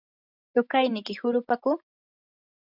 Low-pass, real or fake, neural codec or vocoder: 5.4 kHz; real; none